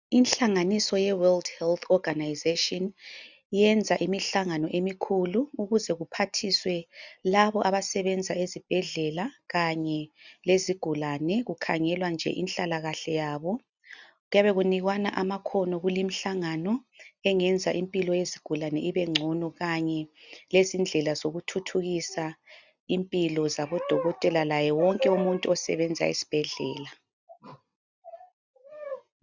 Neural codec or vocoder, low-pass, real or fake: none; 7.2 kHz; real